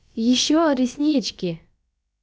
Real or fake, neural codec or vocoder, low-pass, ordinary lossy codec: fake; codec, 16 kHz, about 1 kbps, DyCAST, with the encoder's durations; none; none